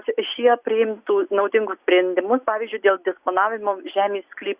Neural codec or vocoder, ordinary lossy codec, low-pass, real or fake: none; Opus, 64 kbps; 3.6 kHz; real